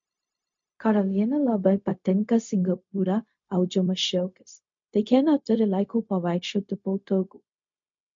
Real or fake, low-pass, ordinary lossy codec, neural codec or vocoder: fake; 7.2 kHz; MP3, 48 kbps; codec, 16 kHz, 0.4 kbps, LongCat-Audio-Codec